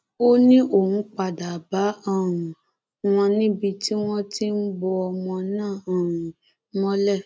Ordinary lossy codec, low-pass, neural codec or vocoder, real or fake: none; none; none; real